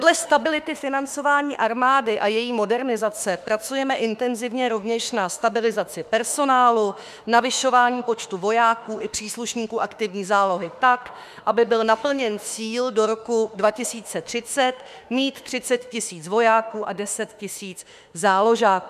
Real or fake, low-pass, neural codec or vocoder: fake; 14.4 kHz; autoencoder, 48 kHz, 32 numbers a frame, DAC-VAE, trained on Japanese speech